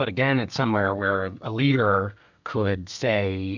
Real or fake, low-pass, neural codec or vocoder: fake; 7.2 kHz; codec, 32 kHz, 1.9 kbps, SNAC